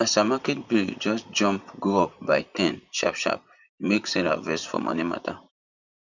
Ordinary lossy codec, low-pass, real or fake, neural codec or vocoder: none; 7.2 kHz; fake; vocoder, 44.1 kHz, 128 mel bands, Pupu-Vocoder